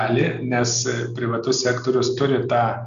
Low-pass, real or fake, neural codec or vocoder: 7.2 kHz; real; none